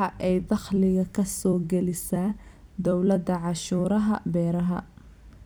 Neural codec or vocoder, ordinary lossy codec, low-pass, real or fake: vocoder, 44.1 kHz, 128 mel bands every 256 samples, BigVGAN v2; none; none; fake